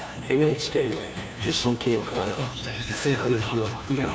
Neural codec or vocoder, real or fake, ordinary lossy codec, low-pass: codec, 16 kHz, 1 kbps, FunCodec, trained on LibriTTS, 50 frames a second; fake; none; none